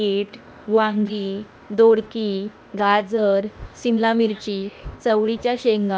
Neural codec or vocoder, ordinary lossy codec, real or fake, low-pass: codec, 16 kHz, 0.8 kbps, ZipCodec; none; fake; none